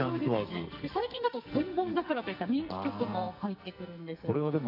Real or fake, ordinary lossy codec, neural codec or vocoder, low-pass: fake; none; codec, 44.1 kHz, 2.6 kbps, SNAC; 5.4 kHz